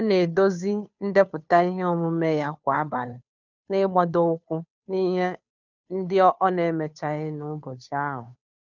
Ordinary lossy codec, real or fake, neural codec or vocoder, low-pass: none; fake; codec, 16 kHz, 2 kbps, FunCodec, trained on Chinese and English, 25 frames a second; 7.2 kHz